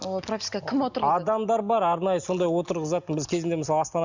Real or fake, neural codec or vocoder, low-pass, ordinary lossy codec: real; none; 7.2 kHz; Opus, 64 kbps